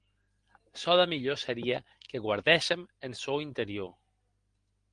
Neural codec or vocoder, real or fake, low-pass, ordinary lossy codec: none; real; 9.9 kHz; Opus, 24 kbps